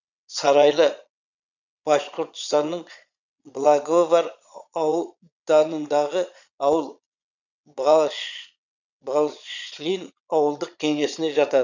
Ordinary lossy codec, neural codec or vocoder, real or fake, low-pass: none; vocoder, 22.05 kHz, 80 mel bands, Vocos; fake; 7.2 kHz